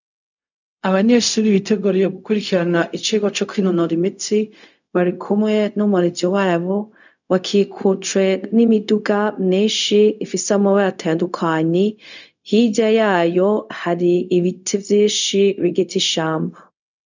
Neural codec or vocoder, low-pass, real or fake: codec, 16 kHz, 0.4 kbps, LongCat-Audio-Codec; 7.2 kHz; fake